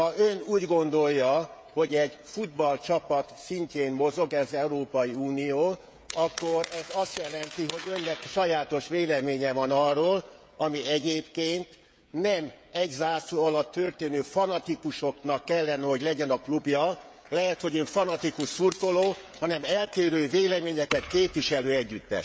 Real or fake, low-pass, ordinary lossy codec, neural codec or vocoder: fake; none; none; codec, 16 kHz, 16 kbps, FreqCodec, smaller model